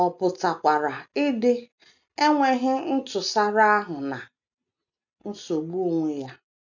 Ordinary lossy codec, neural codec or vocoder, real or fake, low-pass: none; none; real; 7.2 kHz